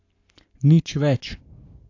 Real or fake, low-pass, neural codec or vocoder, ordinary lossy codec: fake; 7.2 kHz; codec, 44.1 kHz, 7.8 kbps, Pupu-Codec; AAC, 48 kbps